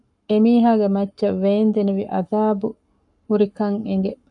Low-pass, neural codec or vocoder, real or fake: 10.8 kHz; codec, 44.1 kHz, 7.8 kbps, Pupu-Codec; fake